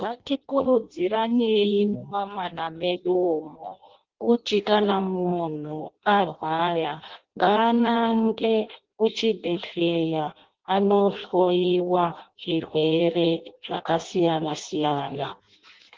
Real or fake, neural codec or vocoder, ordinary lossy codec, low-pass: fake; codec, 16 kHz in and 24 kHz out, 0.6 kbps, FireRedTTS-2 codec; Opus, 16 kbps; 7.2 kHz